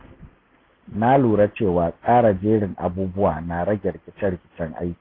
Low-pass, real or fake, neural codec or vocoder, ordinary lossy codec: 5.4 kHz; real; none; AAC, 24 kbps